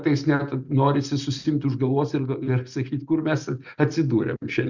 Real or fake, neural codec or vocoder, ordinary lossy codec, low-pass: real; none; Opus, 64 kbps; 7.2 kHz